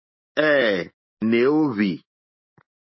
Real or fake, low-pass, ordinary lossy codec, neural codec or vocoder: fake; 7.2 kHz; MP3, 24 kbps; autoencoder, 48 kHz, 128 numbers a frame, DAC-VAE, trained on Japanese speech